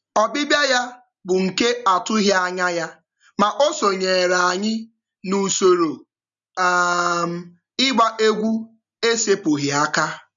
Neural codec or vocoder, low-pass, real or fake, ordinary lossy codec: none; 7.2 kHz; real; none